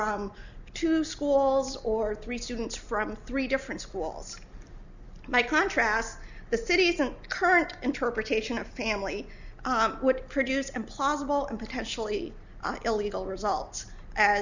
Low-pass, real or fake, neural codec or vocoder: 7.2 kHz; real; none